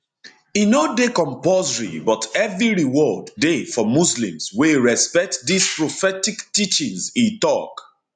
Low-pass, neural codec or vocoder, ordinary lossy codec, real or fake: 9.9 kHz; none; none; real